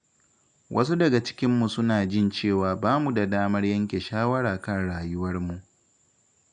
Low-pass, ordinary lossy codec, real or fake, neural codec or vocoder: 9.9 kHz; none; real; none